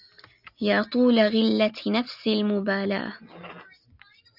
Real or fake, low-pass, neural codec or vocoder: real; 5.4 kHz; none